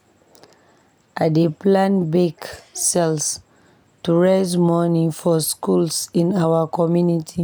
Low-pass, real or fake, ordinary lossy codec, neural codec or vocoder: none; real; none; none